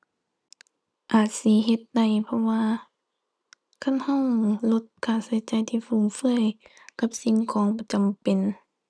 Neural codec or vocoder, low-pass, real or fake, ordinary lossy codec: none; none; real; none